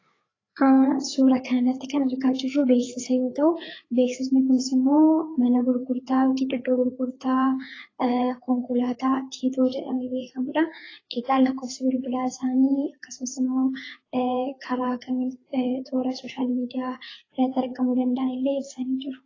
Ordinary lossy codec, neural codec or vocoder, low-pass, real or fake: AAC, 32 kbps; codec, 16 kHz, 4 kbps, FreqCodec, larger model; 7.2 kHz; fake